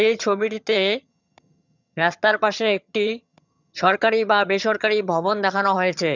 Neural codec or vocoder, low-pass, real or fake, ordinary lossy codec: vocoder, 22.05 kHz, 80 mel bands, HiFi-GAN; 7.2 kHz; fake; none